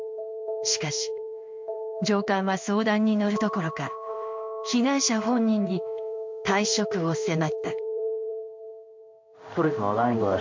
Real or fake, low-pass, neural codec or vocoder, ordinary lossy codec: fake; 7.2 kHz; codec, 16 kHz in and 24 kHz out, 1 kbps, XY-Tokenizer; MP3, 48 kbps